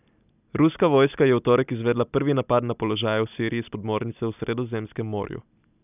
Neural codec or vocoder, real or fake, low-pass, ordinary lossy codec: none; real; 3.6 kHz; none